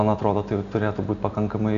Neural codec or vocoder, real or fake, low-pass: none; real; 7.2 kHz